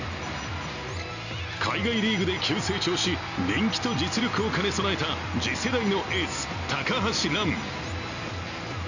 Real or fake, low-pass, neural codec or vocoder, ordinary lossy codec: real; 7.2 kHz; none; none